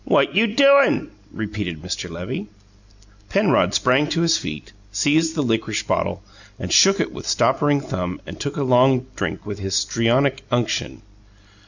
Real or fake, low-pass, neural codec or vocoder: real; 7.2 kHz; none